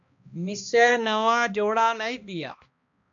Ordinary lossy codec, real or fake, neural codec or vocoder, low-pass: AAC, 64 kbps; fake; codec, 16 kHz, 1 kbps, X-Codec, HuBERT features, trained on balanced general audio; 7.2 kHz